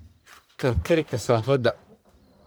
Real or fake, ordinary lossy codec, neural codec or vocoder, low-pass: fake; none; codec, 44.1 kHz, 1.7 kbps, Pupu-Codec; none